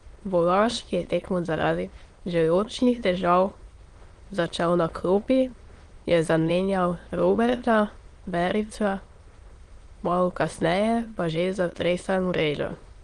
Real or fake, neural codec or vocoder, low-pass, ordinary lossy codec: fake; autoencoder, 22.05 kHz, a latent of 192 numbers a frame, VITS, trained on many speakers; 9.9 kHz; Opus, 24 kbps